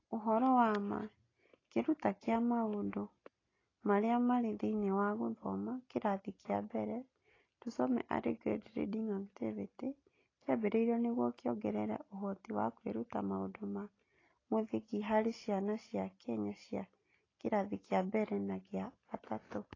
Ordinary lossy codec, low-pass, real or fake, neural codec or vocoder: AAC, 32 kbps; 7.2 kHz; real; none